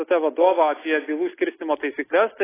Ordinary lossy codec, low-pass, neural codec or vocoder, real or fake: AAC, 16 kbps; 3.6 kHz; none; real